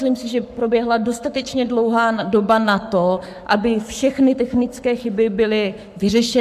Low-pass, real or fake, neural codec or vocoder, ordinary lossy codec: 14.4 kHz; fake; codec, 44.1 kHz, 7.8 kbps, Pupu-Codec; MP3, 96 kbps